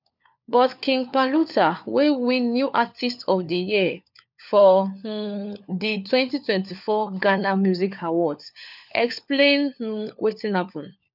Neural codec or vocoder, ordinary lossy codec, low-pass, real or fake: codec, 16 kHz, 4 kbps, FunCodec, trained on LibriTTS, 50 frames a second; none; 5.4 kHz; fake